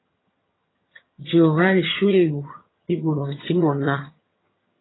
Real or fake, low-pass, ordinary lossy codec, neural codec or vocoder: fake; 7.2 kHz; AAC, 16 kbps; vocoder, 22.05 kHz, 80 mel bands, HiFi-GAN